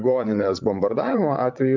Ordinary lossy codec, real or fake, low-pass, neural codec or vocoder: MP3, 64 kbps; fake; 7.2 kHz; vocoder, 22.05 kHz, 80 mel bands, WaveNeXt